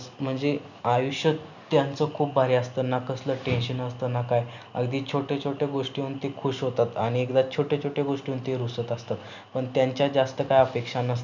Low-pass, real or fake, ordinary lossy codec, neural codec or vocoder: 7.2 kHz; real; none; none